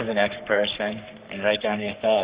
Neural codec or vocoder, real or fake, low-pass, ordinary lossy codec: codec, 44.1 kHz, 3.4 kbps, Pupu-Codec; fake; 3.6 kHz; Opus, 64 kbps